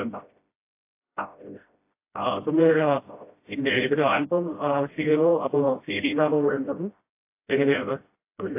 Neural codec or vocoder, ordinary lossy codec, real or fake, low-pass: codec, 16 kHz, 0.5 kbps, FreqCodec, smaller model; AAC, 24 kbps; fake; 3.6 kHz